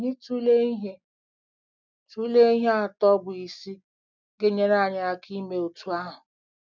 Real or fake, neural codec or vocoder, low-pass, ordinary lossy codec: real; none; 7.2 kHz; none